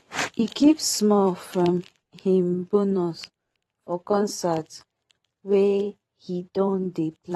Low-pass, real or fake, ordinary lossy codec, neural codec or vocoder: 19.8 kHz; fake; AAC, 32 kbps; vocoder, 44.1 kHz, 128 mel bands every 512 samples, BigVGAN v2